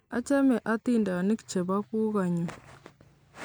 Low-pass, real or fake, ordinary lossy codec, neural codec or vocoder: none; real; none; none